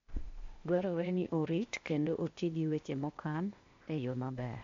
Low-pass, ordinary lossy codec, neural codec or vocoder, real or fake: 7.2 kHz; MP3, 48 kbps; codec, 16 kHz, 0.8 kbps, ZipCodec; fake